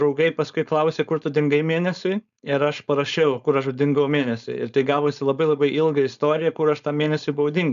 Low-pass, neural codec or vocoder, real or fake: 7.2 kHz; codec, 16 kHz, 4.8 kbps, FACodec; fake